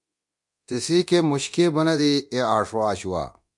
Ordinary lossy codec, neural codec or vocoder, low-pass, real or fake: MP3, 64 kbps; codec, 24 kHz, 0.9 kbps, DualCodec; 10.8 kHz; fake